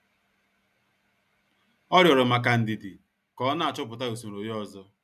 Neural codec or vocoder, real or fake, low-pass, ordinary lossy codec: none; real; 14.4 kHz; none